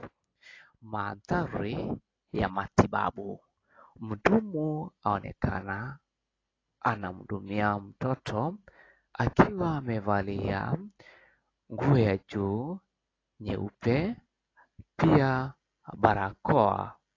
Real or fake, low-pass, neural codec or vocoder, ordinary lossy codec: real; 7.2 kHz; none; AAC, 32 kbps